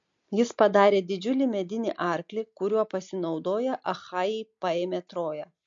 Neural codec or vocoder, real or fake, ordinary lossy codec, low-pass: none; real; MP3, 48 kbps; 7.2 kHz